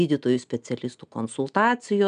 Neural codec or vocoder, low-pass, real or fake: none; 9.9 kHz; real